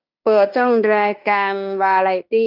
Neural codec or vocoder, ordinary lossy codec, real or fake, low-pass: codec, 16 kHz in and 24 kHz out, 0.9 kbps, LongCat-Audio-Codec, fine tuned four codebook decoder; none; fake; 5.4 kHz